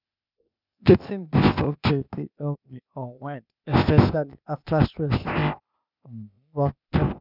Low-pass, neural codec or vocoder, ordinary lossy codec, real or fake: 5.4 kHz; codec, 16 kHz, 0.8 kbps, ZipCodec; none; fake